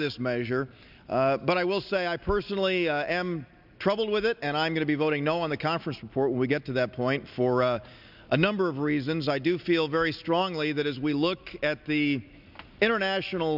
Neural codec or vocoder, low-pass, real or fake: none; 5.4 kHz; real